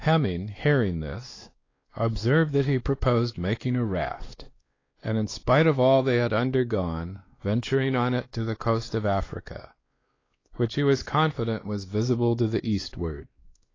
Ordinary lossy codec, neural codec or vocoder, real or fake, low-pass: AAC, 32 kbps; codec, 16 kHz, 2 kbps, X-Codec, WavLM features, trained on Multilingual LibriSpeech; fake; 7.2 kHz